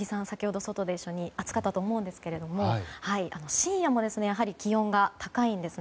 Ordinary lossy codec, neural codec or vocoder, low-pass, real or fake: none; none; none; real